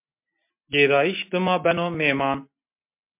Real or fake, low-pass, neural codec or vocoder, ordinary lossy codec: real; 3.6 kHz; none; MP3, 32 kbps